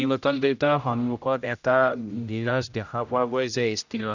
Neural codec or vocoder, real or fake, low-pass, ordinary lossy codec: codec, 16 kHz, 0.5 kbps, X-Codec, HuBERT features, trained on general audio; fake; 7.2 kHz; none